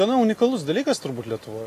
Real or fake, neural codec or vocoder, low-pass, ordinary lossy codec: real; none; 14.4 kHz; AAC, 48 kbps